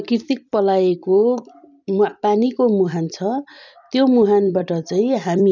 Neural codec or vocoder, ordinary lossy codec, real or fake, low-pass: vocoder, 44.1 kHz, 128 mel bands every 256 samples, BigVGAN v2; none; fake; 7.2 kHz